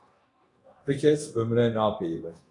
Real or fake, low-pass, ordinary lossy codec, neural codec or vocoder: fake; 10.8 kHz; AAC, 64 kbps; codec, 24 kHz, 0.9 kbps, DualCodec